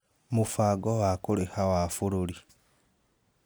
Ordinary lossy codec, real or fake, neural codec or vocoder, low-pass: none; real; none; none